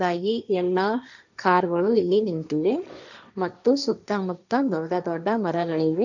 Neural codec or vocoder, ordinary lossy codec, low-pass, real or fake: codec, 16 kHz, 1.1 kbps, Voila-Tokenizer; none; 7.2 kHz; fake